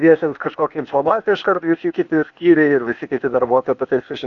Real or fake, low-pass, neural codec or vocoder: fake; 7.2 kHz; codec, 16 kHz, 0.8 kbps, ZipCodec